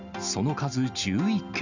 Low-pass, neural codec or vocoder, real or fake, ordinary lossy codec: 7.2 kHz; none; real; none